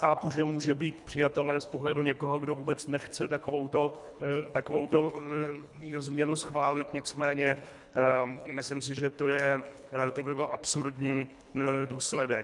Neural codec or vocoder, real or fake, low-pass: codec, 24 kHz, 1.5 kbps, HILCodec; fake; 10.8 kHz